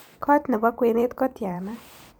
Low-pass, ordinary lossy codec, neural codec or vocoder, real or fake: none; none; vocoder, 44.1 kHz, 128 mel bands every 512 samples, BigVGAN v2; fake